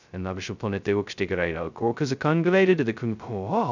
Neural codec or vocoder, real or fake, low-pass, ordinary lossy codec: codec, 16 kHz, 0.2 kbps, FocalCodec; fake; 7.2 kHz; none